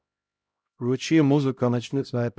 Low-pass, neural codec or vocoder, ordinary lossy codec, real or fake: none; codec, 16 kHz, 0.5 kbps, X-Codec, HuBERT features, trained on LibriSpeech; none; fake